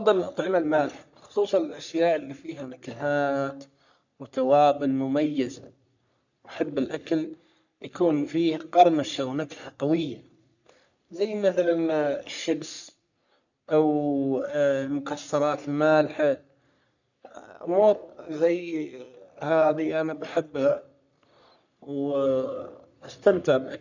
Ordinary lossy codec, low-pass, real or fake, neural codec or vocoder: none; 7.2 kHz; fake; codec, 44.1 kHz, 3.4 kbps, Pupu-Codec